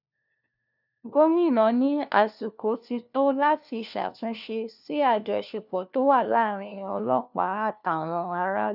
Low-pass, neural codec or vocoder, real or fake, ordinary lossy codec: 5.4 kHz; codec, 16 kHz, 1 kbps, FunCodec, trained on LibriTTS, 50 frames a second; fake; none